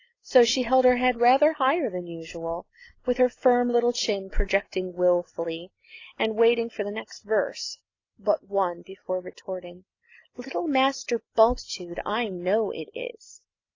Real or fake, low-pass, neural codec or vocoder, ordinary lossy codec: real; 7.2 kHz; none; AAC, 32 kbps